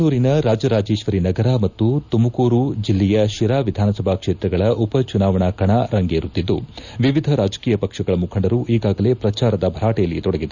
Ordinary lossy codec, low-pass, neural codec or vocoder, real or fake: none; 7.2 kHz; none; real